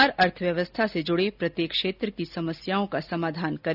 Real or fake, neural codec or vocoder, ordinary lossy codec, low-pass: real; none; none; 5.4 kHz